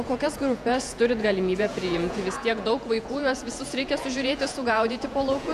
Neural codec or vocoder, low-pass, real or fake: vocoder, 48 kHz, 128 mel bands, Vocos; 14.4 kHz; fake